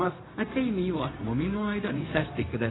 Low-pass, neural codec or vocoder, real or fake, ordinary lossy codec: 7.2 kHz; codec, 16 kHz, 0.4 kbps, LongCat-Audio-Codec; fake; AAC, 16 kbps